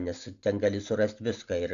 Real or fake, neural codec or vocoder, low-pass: real; none; 7.2 kHz